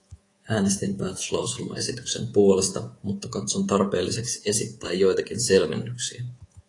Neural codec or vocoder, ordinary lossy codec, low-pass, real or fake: codec, 24 kHz, 3.1 kbps, DualCodec; AAC, 32 kbps; 10.8 kHz; fake